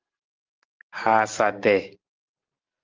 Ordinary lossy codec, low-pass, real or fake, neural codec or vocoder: Opus, 32 kbps; 7.2 kHz; real; none